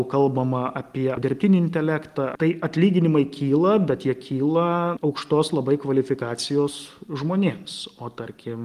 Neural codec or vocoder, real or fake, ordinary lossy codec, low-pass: none; real; Opus, 24 kbps; 14.4 kHz